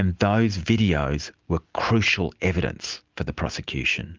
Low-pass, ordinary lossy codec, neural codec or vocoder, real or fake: 7.2 kHz; Opus, 24 kbps; none; real